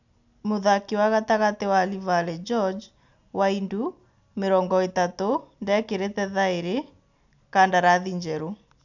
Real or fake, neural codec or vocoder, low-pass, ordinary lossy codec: real; none; 7.2 kHz; none